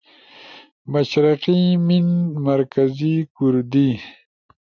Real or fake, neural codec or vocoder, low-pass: real; none; 7.2 kHz